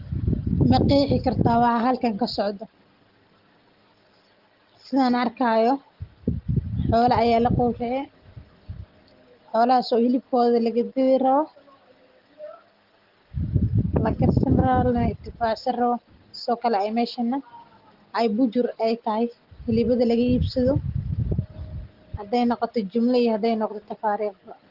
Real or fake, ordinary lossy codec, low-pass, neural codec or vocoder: real; Opus, 16 kbps; 5.4 kHz; none